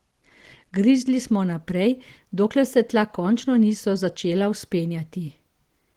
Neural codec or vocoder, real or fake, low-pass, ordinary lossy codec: none; real; 19.8 kHz; Opus, 16 kbps